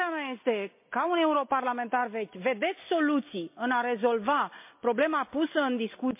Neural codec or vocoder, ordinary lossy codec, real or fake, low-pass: none; MP3, 24 kbps; real; 3.6 kHz